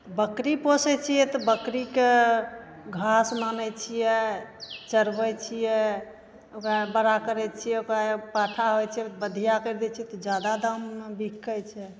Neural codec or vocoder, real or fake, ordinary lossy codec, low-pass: none; real; none; none